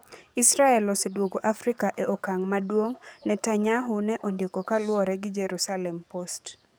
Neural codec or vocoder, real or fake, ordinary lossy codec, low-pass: codec, 44.1 kHz, 7.8 kbps, DAC; fake; none; none